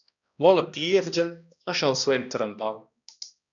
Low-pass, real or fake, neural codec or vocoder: 7.2 kHz; fake; codec, 16 kHz, 1 kbps, X-Codec, HuBERT features, trained on balanced general audio